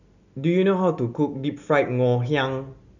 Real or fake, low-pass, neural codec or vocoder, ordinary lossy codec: real; 7.2 kHz; none; none